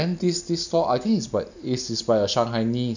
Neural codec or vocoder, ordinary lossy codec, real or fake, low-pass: none; none; real; 7.2 kHz